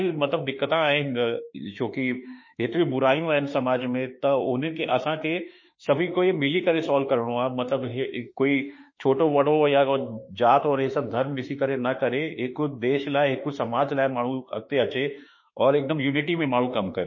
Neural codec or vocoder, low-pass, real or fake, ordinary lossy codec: autoencoder, 48 kHz, 32 numbers a frame, DAC-VAE, trained on Japanese speech; 7.2 kHz; fake; MP3, 32 kbps